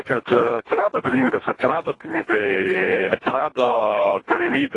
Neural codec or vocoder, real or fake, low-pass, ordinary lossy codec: codec, 24 kHz, 1.5 kbps, HILCodec; fake; 10.8 kHz; AAC, 32 kbps